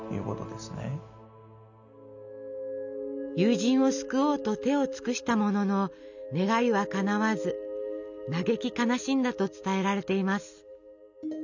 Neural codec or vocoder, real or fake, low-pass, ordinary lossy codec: none; real; 7.2 kHz; none